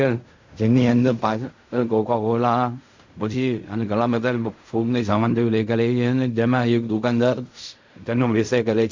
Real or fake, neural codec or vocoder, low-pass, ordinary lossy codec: fake; codec, 16 kHz in and 24 kHz out, 0.4 kbps, LongCat-Audio-Codec, fine tuned four codebook decoder; 7.2 kHz; MP3, 64 kbps